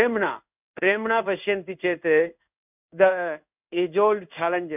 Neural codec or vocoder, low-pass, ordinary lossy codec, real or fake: codec, 16 kHz in and 24 kHz out, 1 kbps, XY-Tokenizer; 3.6 kHz; none; fake